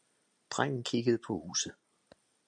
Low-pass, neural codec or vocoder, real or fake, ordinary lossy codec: 9.9 kHz; none; real; MP3, 64 kbps